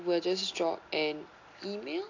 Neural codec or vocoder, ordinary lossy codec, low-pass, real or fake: none; AAC, 48 kbps; 7.2 kHz; real